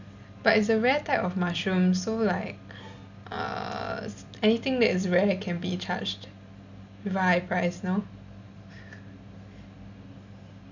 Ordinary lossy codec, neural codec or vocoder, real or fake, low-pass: none; none; real; 7.2 kHz